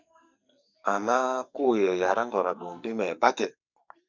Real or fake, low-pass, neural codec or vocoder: fake; 7.2 kHz; codec, 44.1 kHz, 2.6 kbps, SNAC